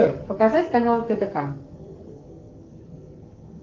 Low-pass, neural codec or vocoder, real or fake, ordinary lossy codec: 7.2 kHz; codec, 44.1 kHz, 2.6 kbps, SNAC; fake; Opus, 24 kbps